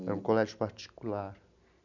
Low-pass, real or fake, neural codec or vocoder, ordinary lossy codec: 7.2 kHz; real; none; none